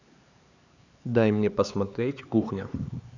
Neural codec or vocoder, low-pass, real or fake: codec, 16 kHz, 4 kbps, X-Codec, HuBERT features, trained on LibriSpeech; 7.2 kHz; fake